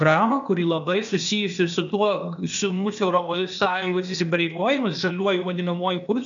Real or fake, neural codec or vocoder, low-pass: fake; codec, 16 kHz, 0.8 kbps, ZipCodec; 7.2 kHz